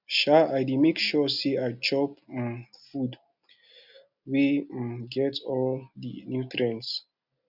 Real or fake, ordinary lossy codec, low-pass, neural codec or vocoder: real; none; 5.4 kHz; none